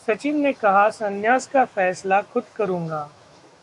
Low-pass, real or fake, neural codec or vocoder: 10.8 kHz; fake; autoencoder, 48 kHz, 128 numbers a frame, DAC-VAE, trained on Japanese speech